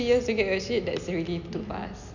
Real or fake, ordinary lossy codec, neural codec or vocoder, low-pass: real; none; none; 7.2 kHz